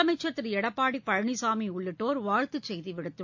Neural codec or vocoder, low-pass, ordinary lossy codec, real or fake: none; 7.2 kHz; MP3, 48 kbps; real